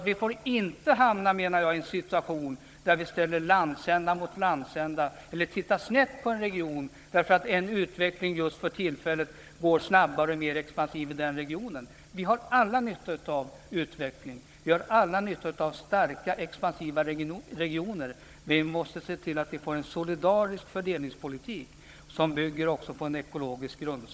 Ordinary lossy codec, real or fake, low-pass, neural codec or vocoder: none; fake; none; codec, 16 kHz, 16 kbps, FunCodec, trained on Chinese and English, 50 frames a second